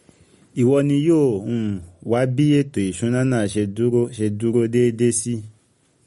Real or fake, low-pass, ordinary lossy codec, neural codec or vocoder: real; 19.8 kHz; MP3, 48 kbps; none